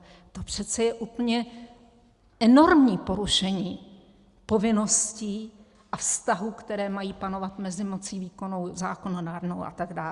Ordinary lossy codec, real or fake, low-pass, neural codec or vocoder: Opus, 64 kbps; real; 10.8 kHz; none